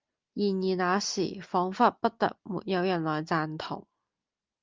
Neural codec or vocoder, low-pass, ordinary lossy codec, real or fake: none; 7.2 kHz; Opus, 24 kbps; real